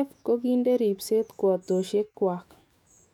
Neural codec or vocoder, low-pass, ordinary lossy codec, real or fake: autoencoder, 48 kHz, 128 numbers a frame, DAC-VAE, trained on Japanese speech; 19.8 kHz; none; fake